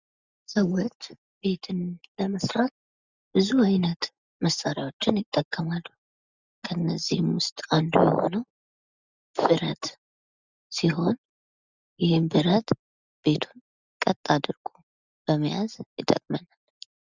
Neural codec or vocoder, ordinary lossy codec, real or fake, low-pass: none; Opus, 64 kbps; real; 7.2 kHz